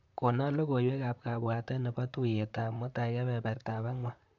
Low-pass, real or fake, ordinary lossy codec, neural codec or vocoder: 7.2 kHz; fake; MP3, 48 kbps; vocoder, 44.1 kHz, 128 mel bands, Pupu-Vocoder